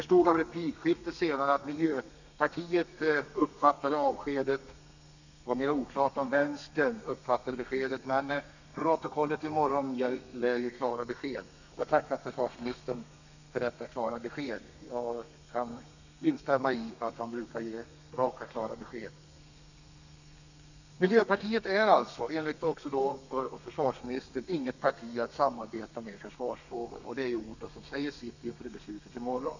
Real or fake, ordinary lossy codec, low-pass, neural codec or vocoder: fake; none; 7.2 kHz; codec, 32 kHz, 1.9 kbps, SNAC